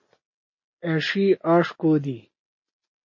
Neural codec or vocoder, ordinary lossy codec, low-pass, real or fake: none; MP3, 32 kbps; 7.2 kHz; real